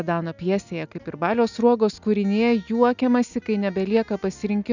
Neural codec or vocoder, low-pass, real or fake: none; 7.2 kHz; real